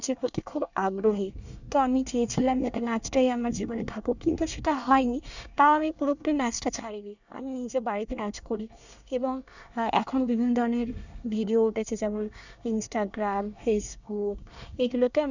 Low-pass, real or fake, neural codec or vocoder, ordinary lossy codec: 7.2 kHz; fake; codec, 24 kHz, 1 kbps, SNAC; none